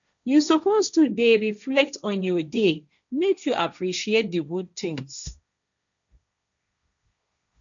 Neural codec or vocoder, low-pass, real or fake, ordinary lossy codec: codec, 16 kHz, 1.1 kbps, Voila-Tokenizer; 7.2 kHz; fake; none